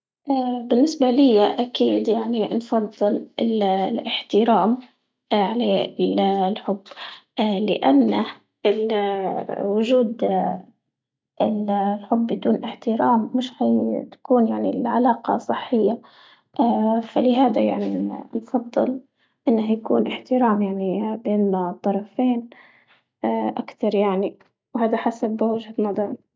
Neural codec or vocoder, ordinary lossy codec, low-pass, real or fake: none; none; none; real